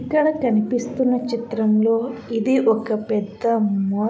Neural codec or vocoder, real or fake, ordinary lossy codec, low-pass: none; real; none; none